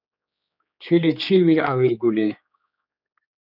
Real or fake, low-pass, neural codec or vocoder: fake; 5.4 kHz; codec, 16 kHz, 4 kbps, X-Codec, HuBERT features, trained on general audio